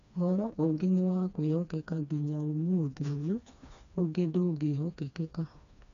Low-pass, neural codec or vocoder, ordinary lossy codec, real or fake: 7.2 kHz; codec, 16 kHz, 2 kbps, FreqCodec, smaller model; MP3, 64 kbps; fake